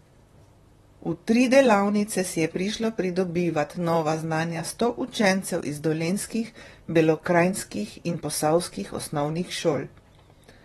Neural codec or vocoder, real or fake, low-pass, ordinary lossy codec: vocoder, 44.1 kHz, 128 mel bands, Pupu-Vocoder; fake; 19.8 kHz; AAC, 32 kbps